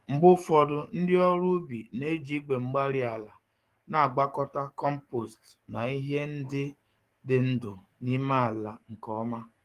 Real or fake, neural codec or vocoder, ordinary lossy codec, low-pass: fake; codec, 44.1 kHz, 7.8 kbps, DAC; Opus, 24 kbps; 14.4 kHz